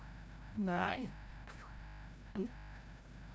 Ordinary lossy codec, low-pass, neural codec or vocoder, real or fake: none; none; codec, 16 kHz, 0.5 kbps, FreqCodec, larger model; fake